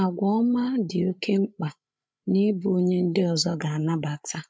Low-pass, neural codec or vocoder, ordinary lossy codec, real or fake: none; codec, 16 kHz, 8 kbps, FreqCodec, larger model; none; fake